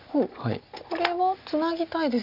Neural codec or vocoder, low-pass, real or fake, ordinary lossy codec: none; 5.4 kHz; real; none